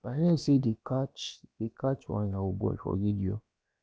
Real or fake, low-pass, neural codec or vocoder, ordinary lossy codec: fake; none; codec, 16 kHz, about 1 kbps, DyCAST, with the encoder's durations; none